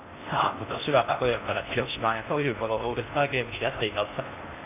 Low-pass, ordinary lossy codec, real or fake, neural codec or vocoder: 3.6 kHz; none; fake; codec, 16 kHz in and 24 kHz out, 0.6 kbps, FocalCodec, streaming, 4096 codes